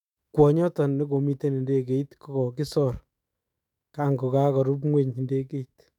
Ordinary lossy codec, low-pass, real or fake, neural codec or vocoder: none; 19.8 kHz; fake; autoencoder, 48 kHz, 128 numbers a frame, DAC-VAE, trained on Japanese speech